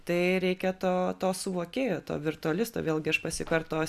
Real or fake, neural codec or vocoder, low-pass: real; none; 14.4 kHz